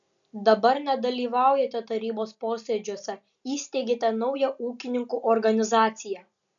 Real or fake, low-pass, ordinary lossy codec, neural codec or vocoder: real; 7.2 kHz; MP3, 96 kbps; none